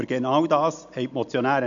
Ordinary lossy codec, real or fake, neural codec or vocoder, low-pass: MP3, 64 kbps; real; none; 7.2 kHz